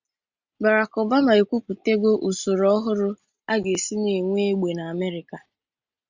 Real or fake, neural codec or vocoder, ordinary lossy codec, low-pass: real; none; Opus, 64 kbps; 7.2 kHz